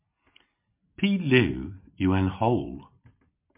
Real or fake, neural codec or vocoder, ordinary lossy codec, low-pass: real; none; MP3, 32 kbps; 3.6 kHz